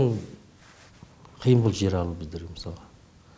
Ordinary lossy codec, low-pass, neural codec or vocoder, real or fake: none; none; none; real